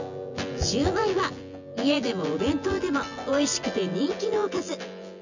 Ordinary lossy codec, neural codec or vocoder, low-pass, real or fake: none; vocoder, 24 kHz, 100 mel bands, Vocos; 7.2 kHz; fake